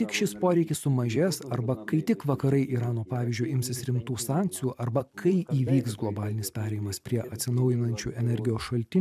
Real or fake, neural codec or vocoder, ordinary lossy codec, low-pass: fake; vocoder, 44.1 kHz, 128 mel bands every 256 samples, BigVGAN v2; AAC, 96 kbps; 14.4 kHz